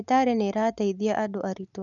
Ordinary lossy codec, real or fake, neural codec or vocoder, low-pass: none; real; none; 7.2 kHz